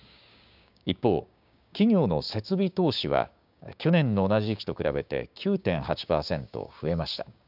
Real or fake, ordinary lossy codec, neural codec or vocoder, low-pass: fake; none; codec, 16 kHz, 6 kbps, DAC; 5.4 kHz